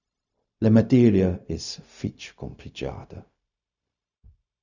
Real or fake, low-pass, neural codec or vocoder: fake; 7.2 kHz; codec, 16 kHz, 0.4 kbps, LongCat-Audio-Codec